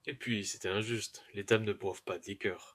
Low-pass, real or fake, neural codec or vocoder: 14.4 kHz; fake; autoencoder, 48 kHz, 128 numbers a frame, DAC-VAE, trained on Japanese speech